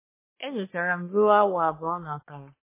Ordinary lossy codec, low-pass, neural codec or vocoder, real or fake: MP3, 16 kbps; 3.6 kHz; codec, 44.1 kHz, 3.4 kbps, Pupu-Codec; fake